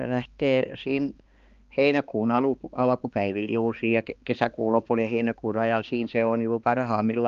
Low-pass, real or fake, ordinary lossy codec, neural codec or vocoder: 7.2 kHz; fake; Opus, 32 kbps; codec, 16 kHz, 2 kbps, X-Codec, HuBERT features, trained on balanced general audio